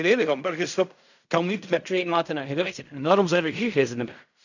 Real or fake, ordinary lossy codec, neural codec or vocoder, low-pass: fake; none; codec, 16 kHz in and 24 kHz out, 0.4 kbps, LongCat-Audio-Codec, fine tuned four codebook decoder; 7.2 kHz